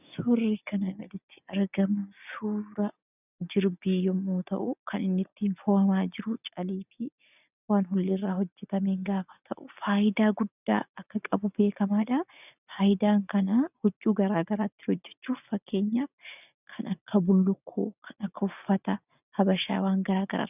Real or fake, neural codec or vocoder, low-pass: real; none; 3.6 kHz